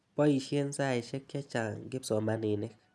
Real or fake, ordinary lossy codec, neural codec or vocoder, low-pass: real; none; none; none